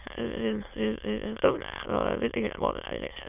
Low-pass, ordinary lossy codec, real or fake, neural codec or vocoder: 3.6 kHz; none; fake; autoencoder, 22.05 kHz, a latent of 192 numbers a frame, VITS, trained on many speakers